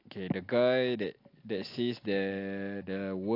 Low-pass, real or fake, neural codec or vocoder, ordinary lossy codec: 5.4 kHz; real; none; AAC, 32 kbps